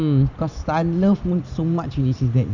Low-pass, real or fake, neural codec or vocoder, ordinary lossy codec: 7.2 kHz; real; none; none